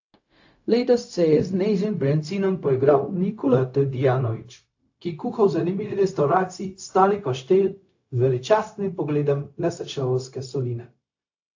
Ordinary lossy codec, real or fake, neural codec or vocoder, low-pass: AAC, 48 kbps; fake; codec, 16 kHz, 0.4 kbps, LongCat-Audio-Codec; 7.2 kHz